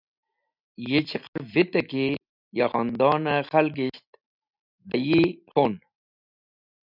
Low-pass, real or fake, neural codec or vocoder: 5.4 kHz; real; none